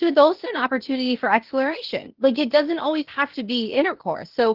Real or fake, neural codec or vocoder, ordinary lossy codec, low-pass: fake; codec, 16 kHz, 0.8 kbps, ZipCodec; Opus, 16 kbps; 5.4 kHz